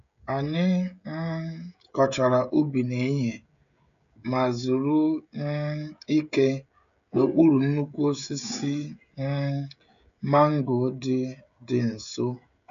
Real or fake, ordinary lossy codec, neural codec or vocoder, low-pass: fake; none; codec, 16 kHz, 16 kbps, FreqCodec, smaller model; 7.2 kHz